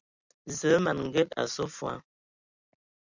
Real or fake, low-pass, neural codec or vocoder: fake; 7.2 kHz; vocoder, 44.1 kHz, 128 mel bands every 256 samples, BigVGAN v2